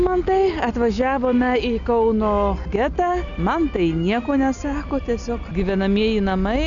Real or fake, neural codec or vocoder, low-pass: real; none; 7.2 kHz